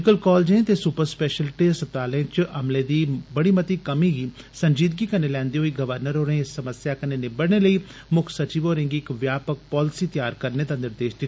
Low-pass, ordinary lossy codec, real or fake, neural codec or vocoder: none; none; real; none